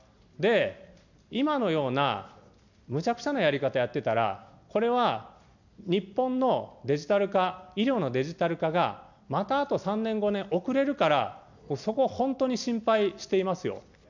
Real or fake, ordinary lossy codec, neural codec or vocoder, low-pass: real; none; none; 7.2 kHz